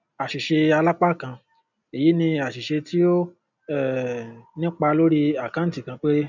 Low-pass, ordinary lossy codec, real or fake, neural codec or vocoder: 7.2 kHz; none; real; none